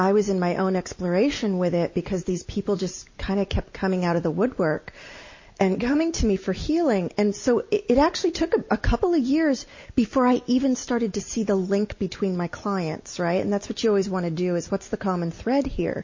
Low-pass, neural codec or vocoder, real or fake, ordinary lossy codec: 7.2 kHz; none; real; MP3, 32 kbps